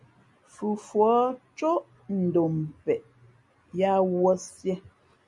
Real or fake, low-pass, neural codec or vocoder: real; 10.8 kHz; none